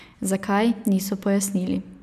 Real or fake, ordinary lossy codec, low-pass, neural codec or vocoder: real; none; 14.4 kHz; none